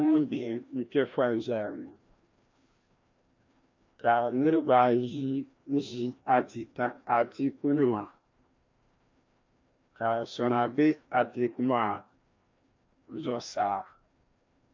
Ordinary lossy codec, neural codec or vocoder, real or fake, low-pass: MP3, 48 kbps; codec, 16 kHz, 1 kbps, FreqCodec, larger model; fake; 7.2 kHz